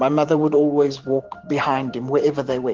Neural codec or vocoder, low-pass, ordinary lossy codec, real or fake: vocoder, 44.1 kHz, 128 mel bands every 512 samples, BigVGAN v2; 7.2 kHz; Opus, 16 kbps; fake